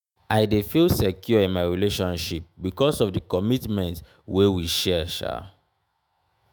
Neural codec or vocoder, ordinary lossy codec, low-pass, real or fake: autoencoder, 48 kHz, 128 numbers a frame, DAC-VAE, trained on Japanese speech; none; none; fake